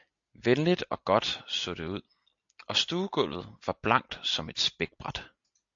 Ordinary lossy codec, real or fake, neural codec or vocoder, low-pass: AAC, 64 kbps; real; none; 7.2 kHz